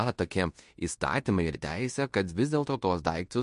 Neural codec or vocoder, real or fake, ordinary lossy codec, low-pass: codec, 16 kHz in and 24 kHz out, 0.9 kbps, LongCat-Audio-Codec, fine tuned four codebook decoder; fake; MP3, 48 kbps; 10.8 kHz